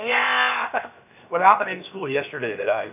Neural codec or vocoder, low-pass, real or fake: codec, 16 kHz, 0.7 kbps, FocalCodec; 3.6 kHz; fake